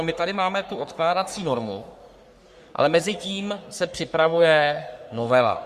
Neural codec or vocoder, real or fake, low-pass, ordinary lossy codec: codec, 44.1 kHz, 3.4 kbps, Pupu-Codec; fake; 14.4 kHz; Opus, 64 kbps